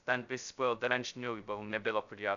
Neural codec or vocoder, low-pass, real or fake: codec, 16 kHz, 0.2 kbps, FocalCodec; 7.2 kHz; fake